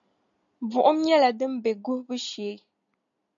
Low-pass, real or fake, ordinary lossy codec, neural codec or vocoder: 7.2 kHz; real; MP3, 64 kbps; none